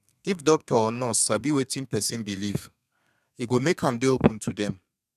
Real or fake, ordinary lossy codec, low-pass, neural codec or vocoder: fake; none; 14.4 kHz; codec, 32 kHz, 1.9 kbps, SNAC